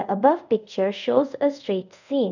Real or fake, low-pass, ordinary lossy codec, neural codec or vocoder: fake; 7.2 kHz; none; codec, 24 kHz, 0.5 kbps, DualCodec